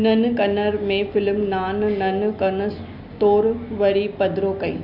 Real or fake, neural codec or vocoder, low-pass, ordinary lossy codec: real; none; 5.4 kHz; none